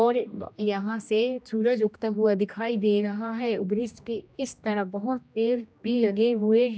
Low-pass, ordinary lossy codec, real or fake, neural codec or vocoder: none; none; fake; codec, 16 kHz, 1 kbps, X-Codec, HuBERT features, trained on general audio